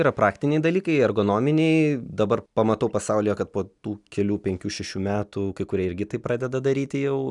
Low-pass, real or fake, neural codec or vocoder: 10.8 kHz; real; none